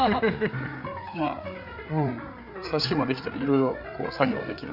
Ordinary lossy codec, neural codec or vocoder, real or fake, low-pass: none; codec, 16 kHz, 8 kbps, FreqCodec, larger model; fake; 5.4 kHz